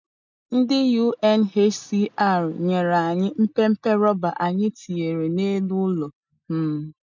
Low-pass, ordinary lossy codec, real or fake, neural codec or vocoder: 7.2 kHz; MP3, 64 kbps; real; none